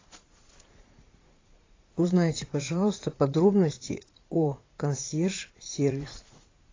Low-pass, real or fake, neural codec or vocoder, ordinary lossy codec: 7.2 kHz; fake; vocoder, 44.1 kHz, 80 mel bands, Vocos; AAC, 32 kbps